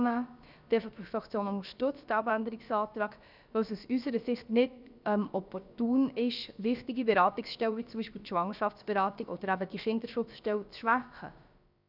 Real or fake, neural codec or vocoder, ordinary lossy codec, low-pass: fake; codec, 16 kHz, about 1 kbps, DyCAST, with the encoder's durations; none; 5.4 kHz